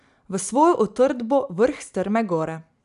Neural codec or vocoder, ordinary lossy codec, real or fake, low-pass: none; none; real; 10.8 kHz